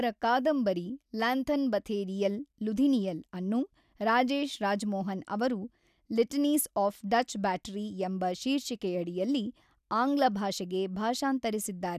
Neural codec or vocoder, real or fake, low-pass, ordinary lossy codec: none; real; 14.4 kHz; none